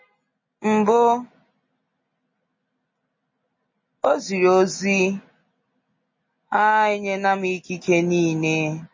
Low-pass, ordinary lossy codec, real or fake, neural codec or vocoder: 7.2 kHz; MP3, 32 kbps; real; none